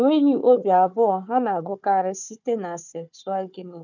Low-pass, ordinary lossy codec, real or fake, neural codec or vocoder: 7.2 kHz; none; fake; codec, 16 kHz, 4 kbps, FunCodec, trained on Chinese and English, 50 frames a second